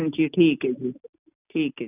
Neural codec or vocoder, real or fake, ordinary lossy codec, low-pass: none; real; none; 3.6 kHz